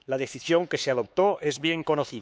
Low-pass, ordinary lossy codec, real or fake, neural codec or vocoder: none; none; fake; codec, 16 kHz, 4 kbps, X-Codec, HuBERT features, trained on LibriSpeech